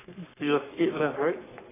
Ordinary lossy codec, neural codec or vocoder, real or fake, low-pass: AAC, 16 kbps; codec, 44.1 kHz, 3.4 kbps, Pupu-Codec; fake; 3.6 kHz